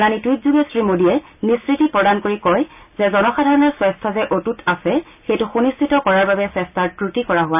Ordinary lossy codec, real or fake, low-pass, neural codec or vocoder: none; real; 3.6 kHz; none